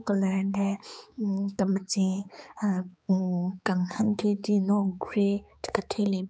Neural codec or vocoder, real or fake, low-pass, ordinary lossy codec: codec, 16 kHz, 4 kbps, X-Codec, HuBERT features, trained on balanced general audio; fake; none; none